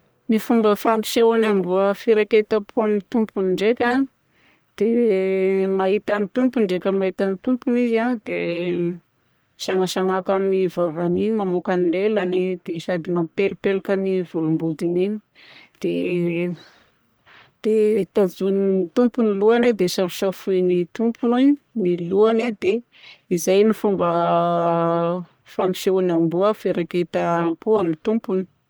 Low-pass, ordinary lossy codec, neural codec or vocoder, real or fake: none; none; codec, 44.1 kHz, 1.7 kbps, Pupu-Codec; fake